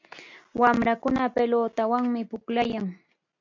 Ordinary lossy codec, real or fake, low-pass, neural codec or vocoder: MP3, 64 kbps; real; 7.2 kHz; none